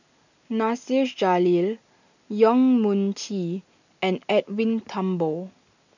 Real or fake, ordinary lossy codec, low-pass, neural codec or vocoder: real; none; 7.2 kHz; none